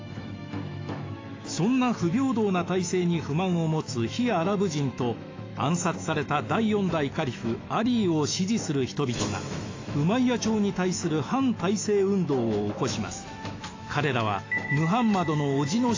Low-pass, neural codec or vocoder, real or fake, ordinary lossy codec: 7.2 kHz; autoencoder, 48 kHz, 128 numbers a frame, DAC-VAE, trained on Japanese speech; fake; AAC, 32 kbps